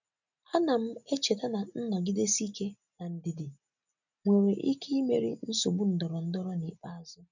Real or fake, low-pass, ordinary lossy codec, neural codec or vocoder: real; 7.2 kHz; none; none